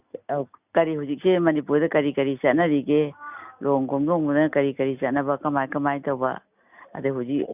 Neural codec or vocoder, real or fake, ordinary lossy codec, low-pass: none; real; none; 3.6 kHz